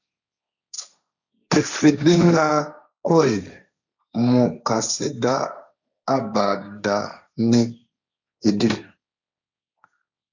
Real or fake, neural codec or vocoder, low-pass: fake; codec, 16 kHz, 1.1 kbps, Voila-Tokenizer; 7.2 kHz